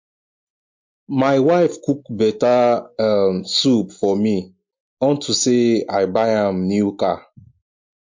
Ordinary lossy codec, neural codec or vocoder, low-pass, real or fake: MP3, 48 kbps; none; 7.2 kHz; real